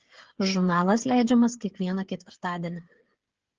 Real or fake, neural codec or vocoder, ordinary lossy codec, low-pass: fake; codec, 16 kHz, 16 kbps, FreqCodec, smaller model; Opus, 16 kbps; 7.2 kHz